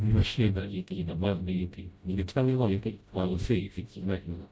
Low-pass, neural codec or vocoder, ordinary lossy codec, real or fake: none; codec, 16 kHz, 0.5 kbps, FreqCodec, smaller model; none; fake